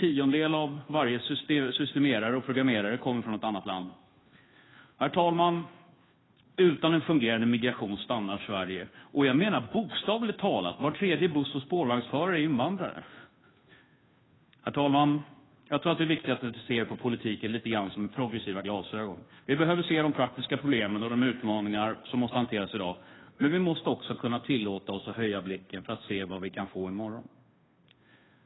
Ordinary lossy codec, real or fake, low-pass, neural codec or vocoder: AAC, 16 kbps; fake; 7.2 kHz; codec, 16 kHz, 2 kbps, FunCodec, trained on Chinese and English, 25 frames a second